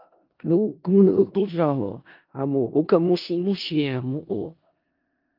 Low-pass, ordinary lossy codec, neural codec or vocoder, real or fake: 5.4 kHz; Opus, 24 kbps; codec, 16 kHz in and 24 kHz out, 0.4 kbps, LongCat-Audio-Codec, four codebook decoder; fake